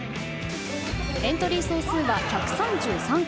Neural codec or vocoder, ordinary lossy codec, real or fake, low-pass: none; none; real; none